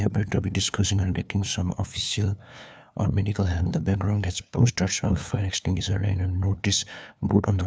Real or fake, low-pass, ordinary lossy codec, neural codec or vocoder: fake; none; none; codec, 16 kHz, 2 kbps, FunCodec, trained on LibriTTS, 25 frames a second